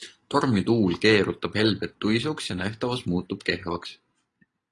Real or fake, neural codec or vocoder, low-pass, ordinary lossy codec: real; none; 10.8 kHz; AAC, 32 kbps